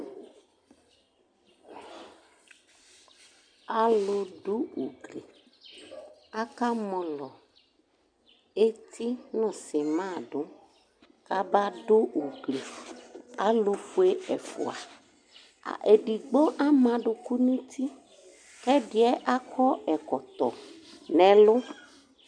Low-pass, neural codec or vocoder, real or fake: 9.9 kHz; none; real